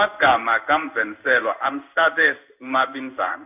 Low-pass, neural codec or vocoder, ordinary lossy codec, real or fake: 3.6 kHz; codec, 16 kHz in and 24 kHz out, 1 kbps, XY-Tokenizer; none; fake